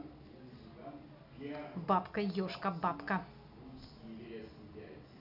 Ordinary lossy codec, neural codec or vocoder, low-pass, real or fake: none; none; 5.4 kHz; real